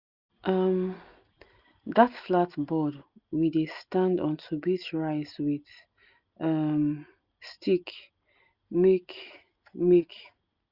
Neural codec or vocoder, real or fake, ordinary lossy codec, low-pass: none; real; Opus, 64 kbps; 5.4 kHz